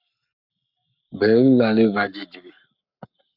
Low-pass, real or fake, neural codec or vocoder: 5.4 kHz; fake; codec, 44.1 kHz, 7.8 kbps, Pupu-Codec